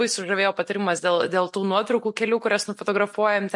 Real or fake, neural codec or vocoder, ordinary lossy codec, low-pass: real; none; MP3, 48 kbps; 10.8 kHz